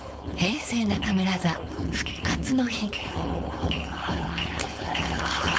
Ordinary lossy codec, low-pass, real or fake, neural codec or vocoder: none; none; fake; codec, 16 kHz, 4.8 kbps, FACodec